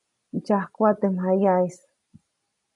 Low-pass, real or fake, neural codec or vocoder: 10.8 kHz; real; none